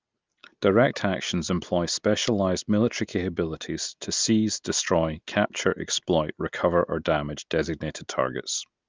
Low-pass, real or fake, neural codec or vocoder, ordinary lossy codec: 7.2 kHz; real; none; Opus, 24 kbps